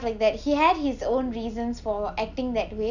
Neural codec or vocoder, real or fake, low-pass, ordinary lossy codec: none; real; 7.2 kHz; none